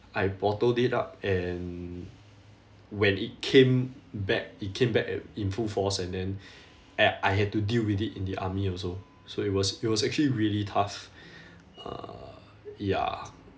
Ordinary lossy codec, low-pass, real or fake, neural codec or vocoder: none; none; real; none